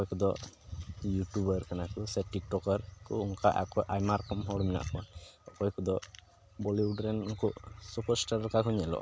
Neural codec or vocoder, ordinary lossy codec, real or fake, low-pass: none; none; real; none